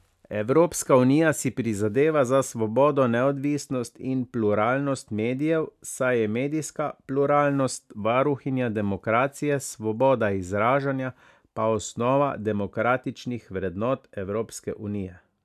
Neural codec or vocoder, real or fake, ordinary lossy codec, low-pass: none; real; AAC, 96 kbps; 14.4 kHz